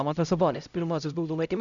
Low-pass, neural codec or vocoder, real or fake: 7.2 kHz; codec, 16 kHz, 0.5 kbps, X-Codec, HuBERT features, trained on LibriSpeech; fake